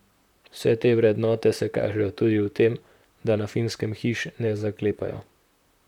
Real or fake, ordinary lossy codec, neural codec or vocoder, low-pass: fake; none; vocoder, 44.1 kHz, 128 mel bands, Pupu-Vocoder; 19.8 kHz